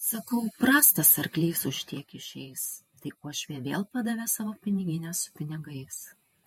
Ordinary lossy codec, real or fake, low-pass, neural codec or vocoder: MP3, 64 kbps; fake; 19.8 kHz; vocoder, 48 kHz, 128 mel bands, Vocos